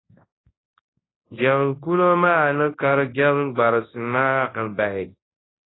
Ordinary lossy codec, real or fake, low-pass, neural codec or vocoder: AAC, 16 kbps; fake; 7.2 kHz; codec, 24 kHz, 0.9 kbps, WavTokenizer, large speech release